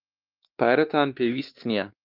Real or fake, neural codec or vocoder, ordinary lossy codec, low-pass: fake; codec, 16 kHz, 2 kbps, X-Codec, WavLM features, trained on Multilingual LibriSpeech; Opus, 32 kbps; 5.4 kHz